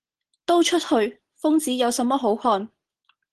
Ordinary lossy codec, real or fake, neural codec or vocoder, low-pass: Opus, 16 kbps; real; none; 10.8 kHz